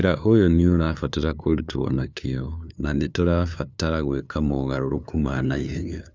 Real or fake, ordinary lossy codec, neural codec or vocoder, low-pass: fake; none; codec, 16 kHz, 2 kbps, FunCodec, trained on LibriTTS, 25 frames a second; none